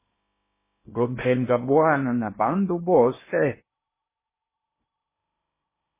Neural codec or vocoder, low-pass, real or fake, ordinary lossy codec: codec, 16 kHz in and 24 kHz out, 0.6 kbps, FocalCodec, streaming, 2048 codes; 3.6 kHz; fake; MP3, 16 kbps